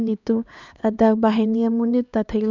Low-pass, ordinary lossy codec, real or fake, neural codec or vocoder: 7.2 kHz; none; fake; codec, 16 kHz, 2 kbps, X-Codec, HuBERT features, trained on LibriSpeech